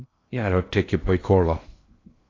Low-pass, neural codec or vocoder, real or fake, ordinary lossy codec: 7.2 kHz; codec, 16 kHz in and 24 kHz out, 0.6 kbps, FocalCodec, streaming, 2048 codes; fake; AAC, 32 kbps